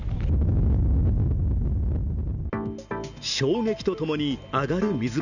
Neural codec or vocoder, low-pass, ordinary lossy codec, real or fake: none; 7.2 kHz; none; real